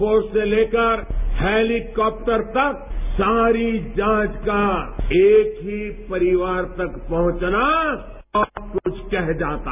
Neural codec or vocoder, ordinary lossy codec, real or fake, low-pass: none; none; real; 3.6 kHz